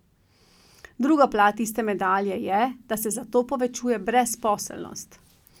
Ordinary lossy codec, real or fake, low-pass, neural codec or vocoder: none; real; 19.8 kHz; none